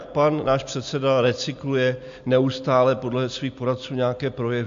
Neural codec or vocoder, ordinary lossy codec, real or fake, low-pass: none; AAC, 64 kbps; real; 7.2 kHz